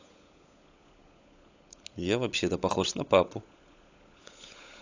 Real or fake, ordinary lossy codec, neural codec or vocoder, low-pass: fake; AAC, 48 kbps; codec, 16 kHz, 16 kbps, FunCodec, trained on LibriTTS, 50 frames a second; 7.2 kHz